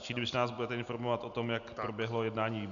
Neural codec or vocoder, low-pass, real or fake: none; 7.2 kHz; real